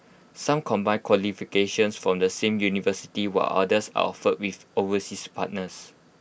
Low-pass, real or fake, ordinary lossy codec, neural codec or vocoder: none; real; none; none